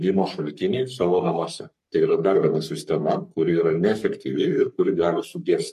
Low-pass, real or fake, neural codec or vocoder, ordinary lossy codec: 14.4 kHz; fake; codec, 44.1 kHz, 3.4 kbps, Pupu-Codec; MP3, 64 kbps